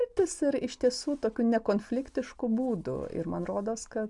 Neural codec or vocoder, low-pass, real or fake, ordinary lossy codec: none; 10.8 kHz; real; MP3, 96 kbps